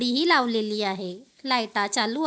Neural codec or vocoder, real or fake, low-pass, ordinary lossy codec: none; real; none; none